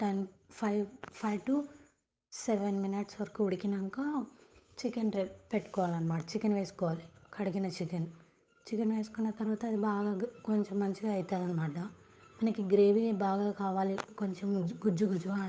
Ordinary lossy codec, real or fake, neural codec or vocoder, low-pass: none; fake; codec, 16 kHz, 8 kbps, FunCodec, trained on Chinese and English, 25 frames a second; none